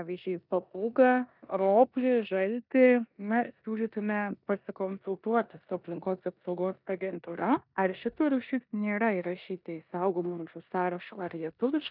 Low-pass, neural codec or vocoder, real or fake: 5.4 kHz; codec, 16 kHz in and 24 kHz out, 0.9 kbps, LongCat-Audio-Codec, four codebook decoder; fake